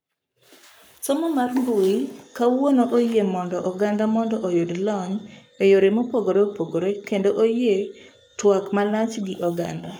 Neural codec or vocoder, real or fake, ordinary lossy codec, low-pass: codec, 44.1 kHz, 7.8 kbps, Pupu-Codec; fake; none; none